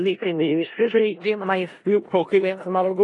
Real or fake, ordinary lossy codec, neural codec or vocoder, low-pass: fake; AAC, 32 kbps; codec, 16 kHz in and 24 kHz out, 0.4 kbps, LongCat-Audio-Codec, four codebook decoder; 10.8 kHz